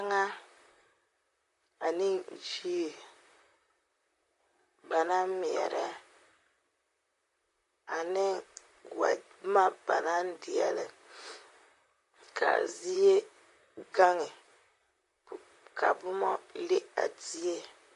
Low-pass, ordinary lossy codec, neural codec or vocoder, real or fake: 14.4 kHz; MP3, 48 kbps; vocoder, 44.1 kHz, 128 mel bands, Pupu-Vocoder; fake